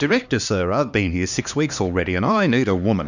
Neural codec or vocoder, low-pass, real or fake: codec, 16 kHz, 2 kbps, X-Codec, HuBERT features, trained on LibriSpeech; 7.2 kHz; fake